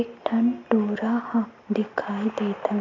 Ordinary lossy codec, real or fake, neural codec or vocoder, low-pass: MP3, 48 kbps; real; none; 7.2 kHz